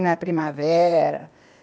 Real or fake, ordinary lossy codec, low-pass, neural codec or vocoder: fake; none; none; codec, 16 kHz, 0.8 kbps, ZipCodec